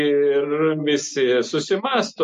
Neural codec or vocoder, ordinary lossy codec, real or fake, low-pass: none; MP3, 48 kbps; real; 10.8 kHz